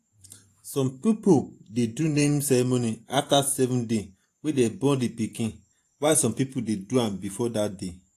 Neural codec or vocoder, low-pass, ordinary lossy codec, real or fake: vocoder, 48 kHz, 128 mel bands, Vocos; 14.4 kHz; AAC, 64 kbps; fake